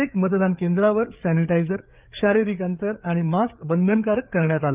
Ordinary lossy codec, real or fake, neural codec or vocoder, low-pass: Opus, 32 kbps; fake; codec, 16 kHz, 4 kbps, FreqCodec, larger model; 3.6 kHz